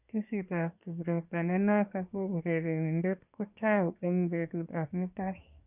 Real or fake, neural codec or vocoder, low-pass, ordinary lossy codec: fake; codec, 24 kHz, 1 kbps, SNAC; 3.6 kHz; none